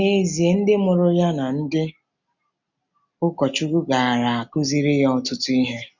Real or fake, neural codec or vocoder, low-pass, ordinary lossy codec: real; none; 7.2 kHz; none